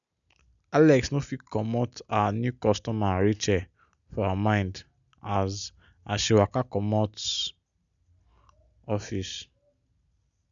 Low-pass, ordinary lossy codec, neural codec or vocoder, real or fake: 7.2 kHz; none; none; real